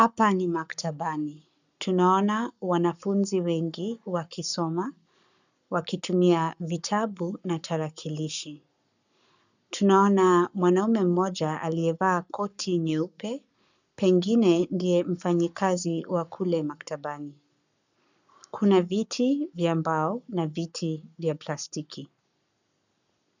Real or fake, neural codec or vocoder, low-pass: fake; codec, 44.1 kHz, 7.8 kbps, Pupu-Codec; 7.2 kHz